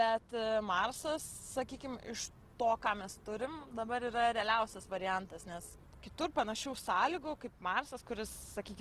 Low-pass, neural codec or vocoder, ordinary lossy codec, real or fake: 14.4 kHz; none; Opus, 16 kbps; real